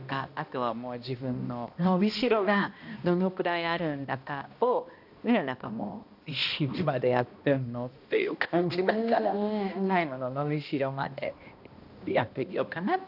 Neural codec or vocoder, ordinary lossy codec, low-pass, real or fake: codec, 16 kHz, 1 kbps, X-Codec, HuBERT features, trained on balanced general audio; AAC, 48 kbps; 5.4 kHz; fake